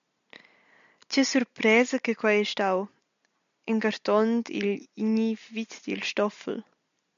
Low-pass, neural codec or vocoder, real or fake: 7.2 kHz; none; real